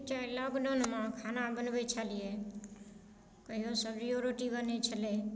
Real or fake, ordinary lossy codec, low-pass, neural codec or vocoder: real; none; none; none